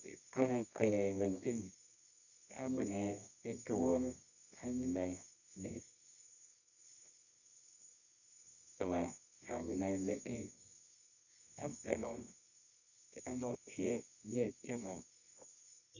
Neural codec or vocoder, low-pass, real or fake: codec, 24 kHz, 0.9 kbps, WavTokenizer, medium music audio release; 7.2 kHz; fake